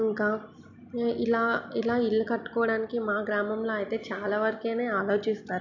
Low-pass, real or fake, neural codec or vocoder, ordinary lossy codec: 7.2 kHz; real; none; none